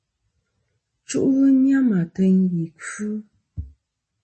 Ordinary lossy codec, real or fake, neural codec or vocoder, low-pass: MP3, 32 kbps; real; none; 10.8 kHz